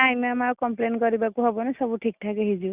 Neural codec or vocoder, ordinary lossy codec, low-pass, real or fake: none; Opus, 64 kbps; 3.6 kHz; real